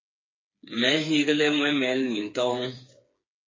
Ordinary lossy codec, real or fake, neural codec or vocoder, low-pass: MP3, 32 kbps; fake; codec, 16 kHz, 4 kbps, FreqCodec, smaller model; 7.2 kHz